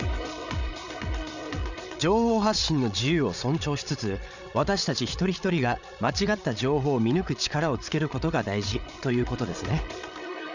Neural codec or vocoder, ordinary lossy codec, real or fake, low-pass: codec, 16 kHz, 8 kbps, FreqCodec, larger model; none; fake; 7.2 kHz